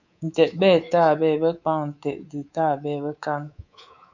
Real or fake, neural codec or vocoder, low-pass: fake; codec, 24 kHz, 3.1 kbps, DualCodec; 7.2 kHz